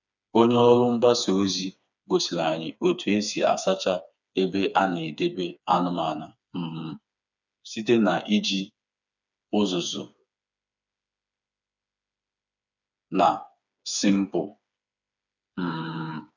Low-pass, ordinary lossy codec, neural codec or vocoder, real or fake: 7.2 kHz; none; codec, 16 kHz, 4 kbps, FreqCodec, smaller model; fake